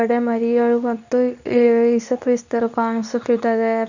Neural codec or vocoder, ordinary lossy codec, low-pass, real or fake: codec, 24 kHz, 0.9 kbps, WavTokenizer, medium speech release version 2; none; 7.2 kHz; fake